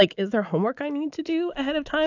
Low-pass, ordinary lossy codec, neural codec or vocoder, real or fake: 7.2 kHz; AAC, 48 kbps; autoencoder, 48 kHz, 128 numbers a frame, DAC-VAE, trained on Japanese speech; fake